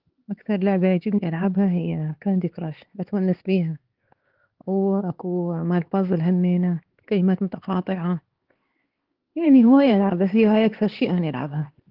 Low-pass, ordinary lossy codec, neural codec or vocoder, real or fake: 5.4 kHz; Opus, 16 kbps; codec, 16 kHz, 4 kbps, X-Codec, HuBERT features, trained on LibriSpeech; fake